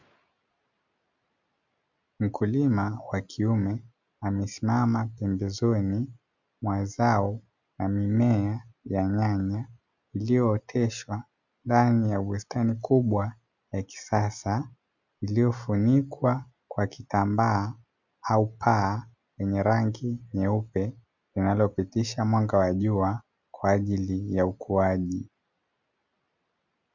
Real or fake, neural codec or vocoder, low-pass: real; none; 7.2 kHz